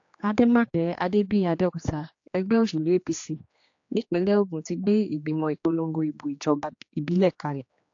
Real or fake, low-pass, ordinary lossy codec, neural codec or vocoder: fake; 7.2 kHz; AAC, 48 kbps; codec, 16 kHz, 2 kbps, X-Codec, HuBERT features, trained on general audio